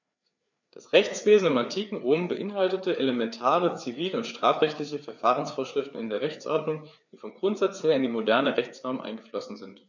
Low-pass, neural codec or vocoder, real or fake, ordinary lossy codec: 7.2 kHz; codec, 16 kHz, 4 kbps, FreqCodec, larger model; fake; none